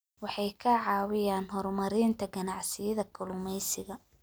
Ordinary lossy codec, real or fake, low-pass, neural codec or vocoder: none; real; none; none